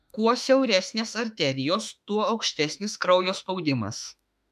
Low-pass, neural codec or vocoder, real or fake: 14.4 kHz; autoencoder, 48 kHz, 32 numbers a frame, DAC-VAE, trained on Japanese speech; fake